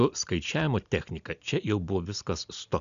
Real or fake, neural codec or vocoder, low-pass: real; none; 7.2 kHz